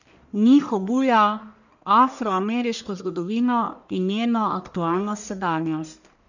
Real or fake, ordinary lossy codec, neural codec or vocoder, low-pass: fake; none; codec, 44.1 kHz, 1.7 kbps, Pupu-Codec; 7.2 kHz